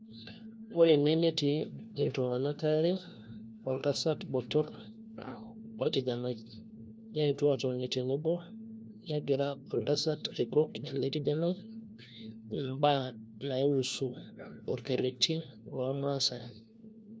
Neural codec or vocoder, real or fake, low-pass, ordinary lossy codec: codec, 16 kHz, 1 kbps, FunCodec, trained on LibriTTS, 50 frames a second; fake; none; none